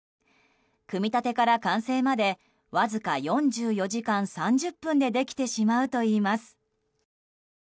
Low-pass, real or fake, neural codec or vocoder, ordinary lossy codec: none; real; none; none